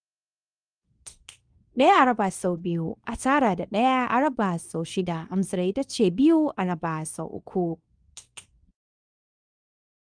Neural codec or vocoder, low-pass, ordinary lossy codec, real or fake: codec, 24 kHz, 0.9 kbps, WavTokenizer, medium speech release version 2; 9.9 kHz; Opus, 32 kbps; fake